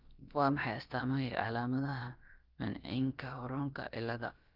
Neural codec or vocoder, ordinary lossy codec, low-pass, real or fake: codec, 16 kHz, about 1 kbps, DyCAST, with the encoder's durations; Opus, 32 kbps; 5.4 kHz; fake